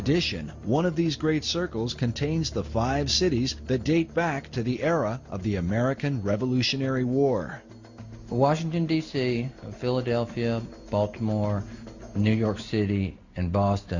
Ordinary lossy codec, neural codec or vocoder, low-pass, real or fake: Opus, 64 kbps; none; 7.2 kHz; real